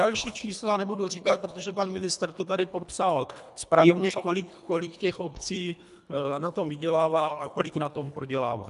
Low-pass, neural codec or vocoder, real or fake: 10.8 kHz; codec, 24 kHz, 1.5 kbps, HILCodec; fake